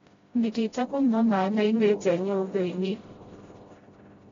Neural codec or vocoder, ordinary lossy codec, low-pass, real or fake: codec, 16 kHz, 0.5 kbps, FreqCodec, smaller model; AAC, 24 kbps; 7.2 kHz; fake